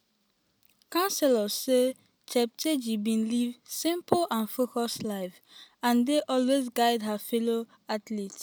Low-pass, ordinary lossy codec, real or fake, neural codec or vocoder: none; none; real; none